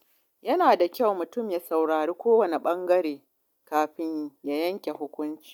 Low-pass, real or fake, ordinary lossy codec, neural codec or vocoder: 19.8 kHz; real; MP3, 96 kbps; none